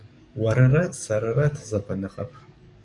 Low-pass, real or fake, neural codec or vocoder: 10.8 kHz; fake; codec, 44.1 kHz, 7.8 kbps, DAC